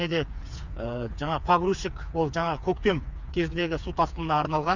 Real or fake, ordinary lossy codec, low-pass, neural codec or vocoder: fake; none; 7.2 kHz; codec, 16 kHz, 4 kbps, FreqCodec, smaller model